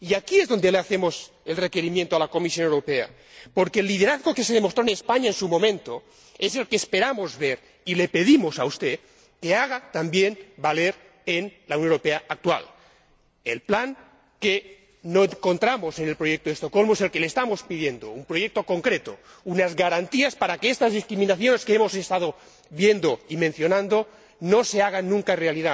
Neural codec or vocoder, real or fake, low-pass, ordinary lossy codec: none; real; none; none